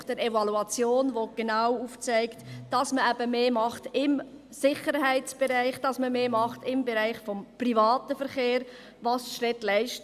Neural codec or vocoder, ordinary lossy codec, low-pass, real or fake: none; Opus, 64 kbps; 14.4 kHz; real